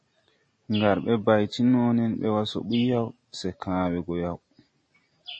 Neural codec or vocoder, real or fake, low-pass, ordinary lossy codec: none; real; 9.9 kHz; MP3, 32 kbps